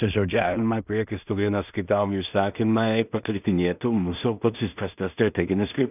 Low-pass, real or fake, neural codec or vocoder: 3.6 kHz; fake; codec, 16 kHz in and 24 kHz out, 0.4 kbps, LongCat-Audio-Codec, two codebook decoder